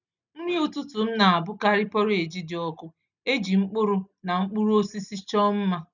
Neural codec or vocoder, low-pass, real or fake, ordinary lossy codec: none; 7.2 kHz; real; none